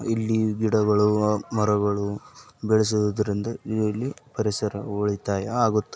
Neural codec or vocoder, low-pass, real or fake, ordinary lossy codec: none; none; real; none